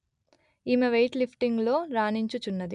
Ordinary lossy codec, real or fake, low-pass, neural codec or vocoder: none; real; 10.8 kHz; none